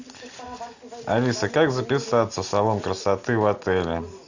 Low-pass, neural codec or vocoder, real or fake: 7.2 kHz; none; real